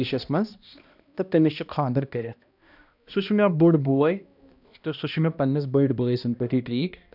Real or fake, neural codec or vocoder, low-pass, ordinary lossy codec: fake; codec, 16 kHz, 1 kbps, X-Codec, HuBERT features, trained on balanced general audio; 5.4 kHz; none